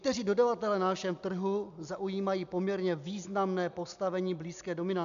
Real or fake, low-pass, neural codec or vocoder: real; 7.2 kHz; none